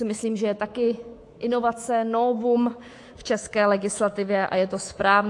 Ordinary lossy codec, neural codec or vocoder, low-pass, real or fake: AAC, 48 kbps; codec, 24 kHz, 3.1 kbps, DualCodec; 10.8 kHz; fake